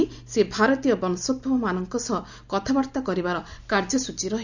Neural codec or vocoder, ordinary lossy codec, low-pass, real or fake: none; none; 7.2 kHz; real